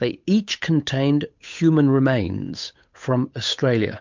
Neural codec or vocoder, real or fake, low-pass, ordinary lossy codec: codec, 16 kHz, 8 kbps, FunCodec, trained on Chinese and English, 25 frames a second; fake; 7.2 kHz; MP3, 64 kbps